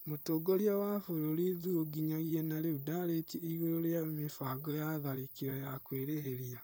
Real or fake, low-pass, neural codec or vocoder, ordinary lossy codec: fake; none; vocoder, 44.1 kHz, 128 mel bands, Pupu-Vocoder; none